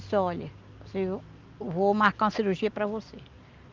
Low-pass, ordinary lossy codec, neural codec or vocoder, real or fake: 7.2 kHz; Opus, 24 kbps; none; real